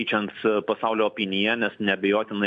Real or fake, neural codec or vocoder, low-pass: real; none; 9.9 kHz